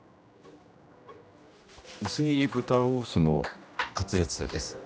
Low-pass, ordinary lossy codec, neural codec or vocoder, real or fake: none; none; codec, 16 kHz, 1 kbps, X-Codec, HuBERT features, trained on general audio; fake